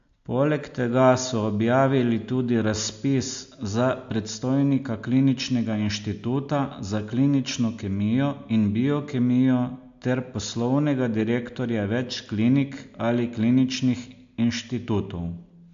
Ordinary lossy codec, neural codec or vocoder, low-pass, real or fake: AAC, 64 kbps; none; 7.2 kHz; real